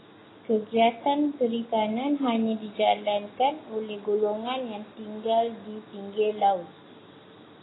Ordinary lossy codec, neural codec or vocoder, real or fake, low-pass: AAC, 16 kbps; none; real; 7.2 kHz